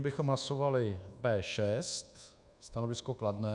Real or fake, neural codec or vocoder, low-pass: fake; codec, 24 kHz, 1.2 kbps, DualCodec; 10.8 kHz